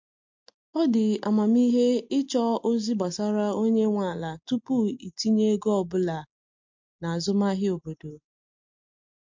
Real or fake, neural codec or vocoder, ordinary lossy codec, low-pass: real; none; MP3, 48 kbps; 7.2 kHz